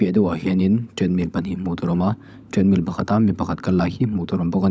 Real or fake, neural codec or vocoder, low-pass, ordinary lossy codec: fake; codec, 16 kHz, 16 kbps, FunCodec, trained on LibriTTS, 50 frames a second; none; none